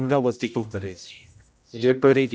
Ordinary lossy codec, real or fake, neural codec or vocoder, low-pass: none; fake; codec, 16 kHz, 0.5 kbps, X-Codec, HuBERT features, trained on general audio; none